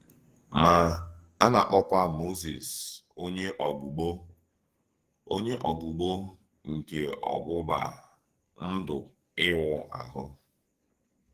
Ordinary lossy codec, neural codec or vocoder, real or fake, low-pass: Opus, 24 kbps; codec, 44.1 kHz, 2.6 kbps, SNAC; fake; 14.4 kHz